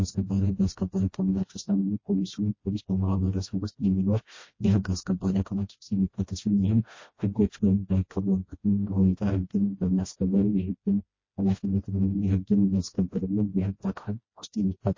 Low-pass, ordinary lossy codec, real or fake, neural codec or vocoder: 7.2 kHz; MP3, 32 kbps; fake; codec, 16 kHz, 1 kbps, FreqCodec, smaller model